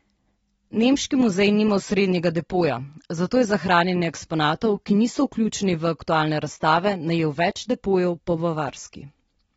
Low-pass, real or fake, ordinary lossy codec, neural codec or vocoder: 19.8 kHz; real; AAC, 24 kbps; none